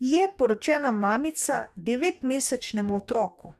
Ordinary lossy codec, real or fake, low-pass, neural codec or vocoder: none; fake; 14.4 kHz; codec, 44.1 kHz, 2.6 kbps, DAC